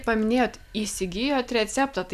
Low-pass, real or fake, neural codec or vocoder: 14.4 kHz; real; none